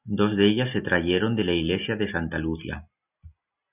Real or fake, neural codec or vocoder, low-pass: real; none; 3.6 kHz